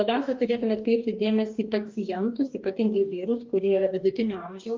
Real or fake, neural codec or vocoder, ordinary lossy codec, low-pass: fake; codec, 44.1 kHz, 2.6 kbps, DAC; Opus, 24 kbps; 7.2 kHz